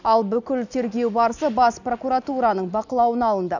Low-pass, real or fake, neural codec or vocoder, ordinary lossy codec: 7.2 kHz; real; none; none